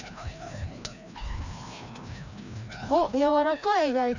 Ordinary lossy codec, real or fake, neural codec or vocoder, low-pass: none; fake; codec, 16 kHz, 1 kbps, FreqCodec, larger model; 7.2 kHz